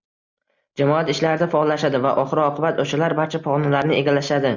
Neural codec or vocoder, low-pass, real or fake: none; 7.2 kHz; real